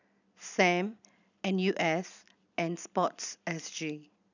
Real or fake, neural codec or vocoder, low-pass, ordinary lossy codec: fake; vocoder, 44.1 kHz, 128 mel bands every 256 samples, BigVGAN v2; 7.2 kHz; none